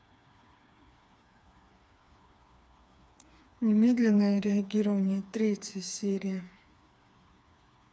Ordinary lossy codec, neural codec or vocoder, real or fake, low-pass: none; codec, 16 kHz, 4 kbps, FreqCodec, smaller model; fake; none